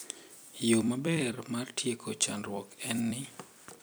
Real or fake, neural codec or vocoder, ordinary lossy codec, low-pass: fake; vocoder, 44.1 kHz, 128 mel bands every 256 samples, BigVGAN v2; none; none